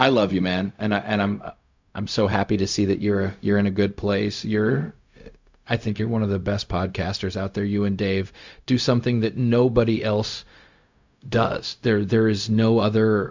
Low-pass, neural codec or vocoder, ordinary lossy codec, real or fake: 7.2 kHz; codec, 16 kHz, 0.4 kbps, LongCat-Audio-Codec; MP3, 64 kbps; fake